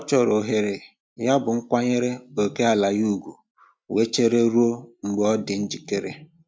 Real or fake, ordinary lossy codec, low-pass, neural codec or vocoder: real; none; none; none